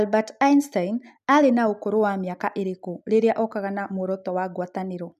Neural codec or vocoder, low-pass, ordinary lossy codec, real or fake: none; 14.4 kHz; none; real